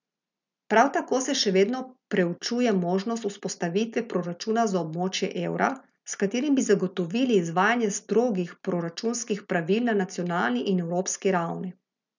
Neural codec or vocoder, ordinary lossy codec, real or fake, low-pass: none; none; real; 7.2 kHz